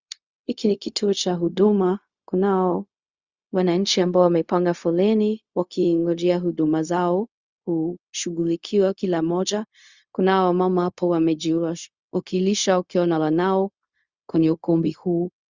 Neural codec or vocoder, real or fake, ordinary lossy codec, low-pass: codec, 16 kHz, 0.4 kbps, LongCat-Audio-Codec; fake; Opus, 64 kbps; 7.2 kHz